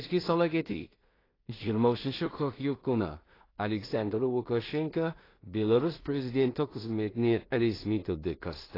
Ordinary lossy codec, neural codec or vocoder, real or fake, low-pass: AAC, 24 kbps; codec, 16 kHz in and 24 kHz out, 0.4 kbps, LongCat-Audio-Codec, two codebook decoder; fake; 5.4 kHz